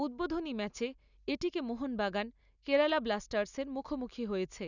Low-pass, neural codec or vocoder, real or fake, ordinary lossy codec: 7.2 kHz; none; real; none